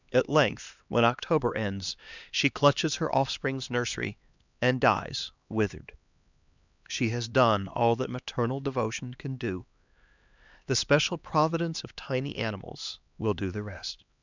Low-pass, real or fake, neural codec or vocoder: 7.2 kHz; fake; codec, 16 kHz, 2 kbps, X-Codec, HuBERT features, trained on LibriSpeech